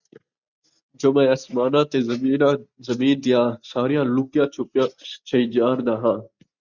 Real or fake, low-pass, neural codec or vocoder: real; 7.2 kHz; none